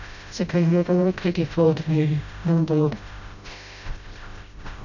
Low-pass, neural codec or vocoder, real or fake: 7.2 kHz; codec, 16 kHz, 0.5 kbps, FreqCodec, smaller model; fake